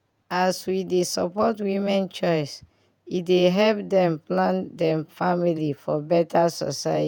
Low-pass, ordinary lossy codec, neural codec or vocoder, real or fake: 19.8 kHz; none; vocoder, 48 kHz, 128 mel bands, Vocos; fake